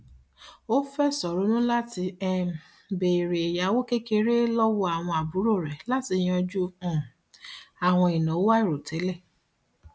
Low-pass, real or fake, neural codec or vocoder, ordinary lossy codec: none; real; none; none